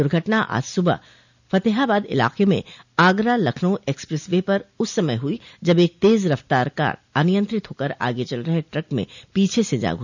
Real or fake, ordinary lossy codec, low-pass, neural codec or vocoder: real; none; 7.2 kHz; none